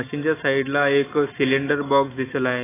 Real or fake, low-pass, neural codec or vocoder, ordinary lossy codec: real; 3.6 kHz; none; AAC, 16 kbps